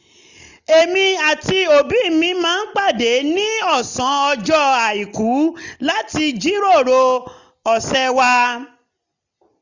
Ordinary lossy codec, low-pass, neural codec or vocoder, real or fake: none; 7.2 kHz; none; real